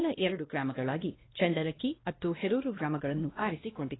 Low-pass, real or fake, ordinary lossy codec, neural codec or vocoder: 7.2 kHz; fake; AAC, 16 kbps; codec, 16 kHz, 1 kbps, X-Codec, WavLM features, trained on Multilingual LibriSpeech